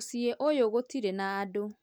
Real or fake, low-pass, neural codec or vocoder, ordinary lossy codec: real; none; none; none